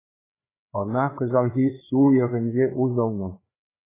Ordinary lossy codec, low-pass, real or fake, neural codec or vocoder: AAC, 16 kbps; 3.6 kHz; fake; codec, 16 kHz, 4 kbps, FreqCodec, larger model